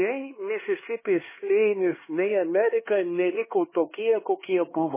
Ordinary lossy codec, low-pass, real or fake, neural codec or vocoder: MP3, 16 kbps; 3.6 kHz; fake; codec, 16 kHz, 2 kbps, X-Codec, HuBERT features, trained on LibriSpeech